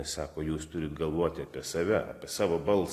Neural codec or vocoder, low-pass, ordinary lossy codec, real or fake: none; 14.4 kHz; AAC, 48 kbps; real